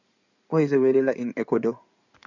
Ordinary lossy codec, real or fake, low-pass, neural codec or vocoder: none; fake; 7.2 kHz; codec, 16 kHz in and 24 kHz out, 2.2 kbps, FireRedTTS-2 codec